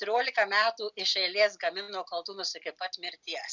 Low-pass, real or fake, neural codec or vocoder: 7.2 kHz; real; none